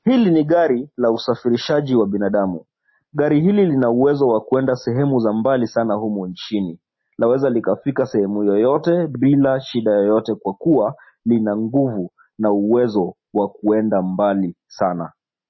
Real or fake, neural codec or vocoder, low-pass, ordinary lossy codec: real; none; 7.2 kHz; MP3, 24 kbps